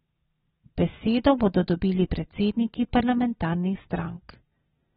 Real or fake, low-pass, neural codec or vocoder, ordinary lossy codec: real; 19.8 kHz; none; AAC, 16 kbps